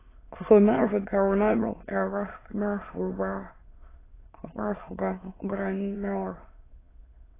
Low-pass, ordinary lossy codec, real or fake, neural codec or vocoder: 3.6 kHz; AAC, 16 kbps; fake; autoencoder, 22.05 kHz, a latent of 192 numbers a frame, VITS, trained on many speakers